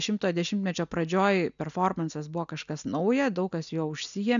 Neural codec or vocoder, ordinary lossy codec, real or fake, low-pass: none; MP3, 96 kbps; real; 7.2 kHz